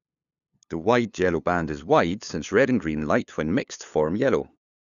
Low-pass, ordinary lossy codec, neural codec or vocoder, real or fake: 7.2 kHz; none; codec, 16 kHz, 2 kbps, FunCodec, trained on LibriTTS, 25 frames a second; fake